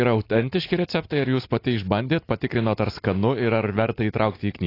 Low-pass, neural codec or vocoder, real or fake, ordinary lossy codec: 5.4 kHz; none; real; AAC, 32 kbps